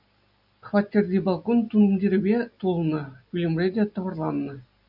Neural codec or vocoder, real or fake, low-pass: none; real; 5.4 kHz